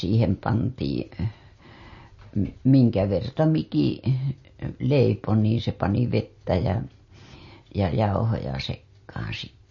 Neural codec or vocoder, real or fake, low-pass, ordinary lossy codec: none; real; 7.2 kHz; MP3, 32 kbps